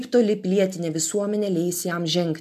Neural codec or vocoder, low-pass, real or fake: none; 14.4 kHz; real